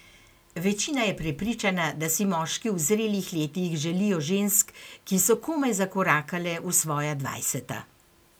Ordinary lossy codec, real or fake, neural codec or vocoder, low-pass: none; real; none; none